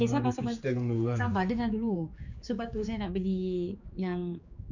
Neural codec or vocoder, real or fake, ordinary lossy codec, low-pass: codec, 16 kHz, 4 kbps, X-Codec, HuBERT features, trained on general audio; fake; none; 7.2 kHz